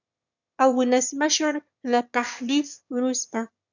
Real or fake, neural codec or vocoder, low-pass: fake; autoencoder, 22.05 kHz, a latent of 192 numbers a frame, VITS, trained on one speaker; 7.2 kHz